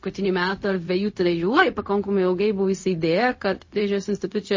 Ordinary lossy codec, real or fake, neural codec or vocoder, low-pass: MP3, 32 kbps; fake; codec, 16 kHz, 0.4 kbps, LongCat-Audio-Codec; 7.2 kHz